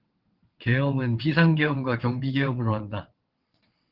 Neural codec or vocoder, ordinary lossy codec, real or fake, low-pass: vocoder, 22.05 kHz, 80 mel bands, WaveNeXt; Opus, 16 kbps; fake; 5.4 kHz